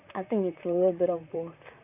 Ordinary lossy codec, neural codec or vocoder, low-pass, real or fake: none; vocoder, 44.1 kHz, 128 mel bands, Pupu-Vocoder; 3.6 kHz; fake